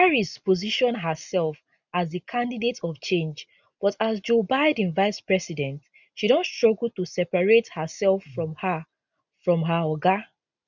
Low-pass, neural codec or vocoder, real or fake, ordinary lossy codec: 7.2 kHz; none; real; none